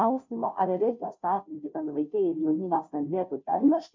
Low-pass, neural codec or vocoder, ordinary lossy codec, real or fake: 7.2 kHz; codec, 16 kHz, 0.5 kbps, FunCodec, trained on Chinese and English, 25 frames a second; MP3, 64 kbps; fake